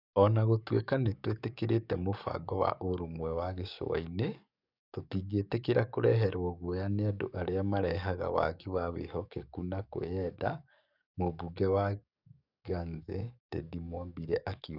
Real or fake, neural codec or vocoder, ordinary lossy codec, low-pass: fake; codec, 44.1 kHz, 7.8 kbps, DAC; none; 5.4 kHz